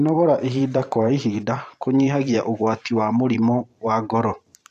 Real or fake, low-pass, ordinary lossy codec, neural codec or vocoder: fake; 14.4 kHz; none; vocoder, 44.1 kHz, 128 mel bands every 512 samples, BigVGAN v2